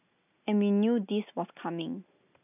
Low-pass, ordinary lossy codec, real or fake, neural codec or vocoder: 3.6 kHz; none; real; none